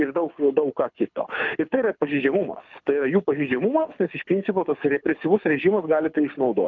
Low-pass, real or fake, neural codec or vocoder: 7.2 kHz; fake; codec, 24 kHz, 6 kbps, HILCodec